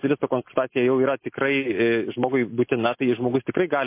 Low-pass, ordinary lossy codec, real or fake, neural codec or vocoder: 3.6 kHz; MP3, 24 kbps; real; none